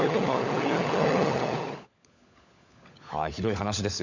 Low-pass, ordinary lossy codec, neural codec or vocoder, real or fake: 7.2 kHz; none; codec, 16 kHz, 16 kbps, FunCodec, trained on LibriTTS, 50 frames a second; fake